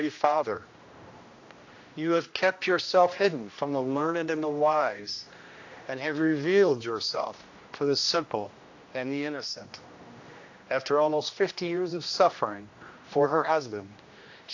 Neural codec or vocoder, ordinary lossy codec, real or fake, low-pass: codec, 16 kHz, 1 kbps, X-Codec, HuBERT features, trained on general audio; AAC, 48 kbps; fake; 7.2 kHz